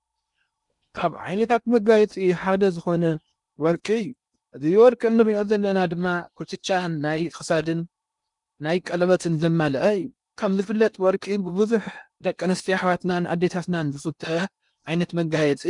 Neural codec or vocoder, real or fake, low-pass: codec, 16 kHz in and 24 kHz out, 0.8 kbps, FocalCodec, streaming, 65536 codes; fake; 10.8 kHz